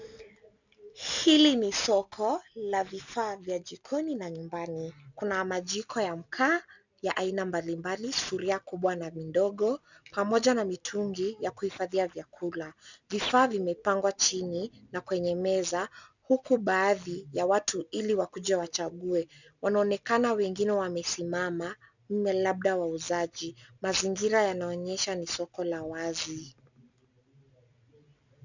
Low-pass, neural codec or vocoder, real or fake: 7.2 kHz; none; real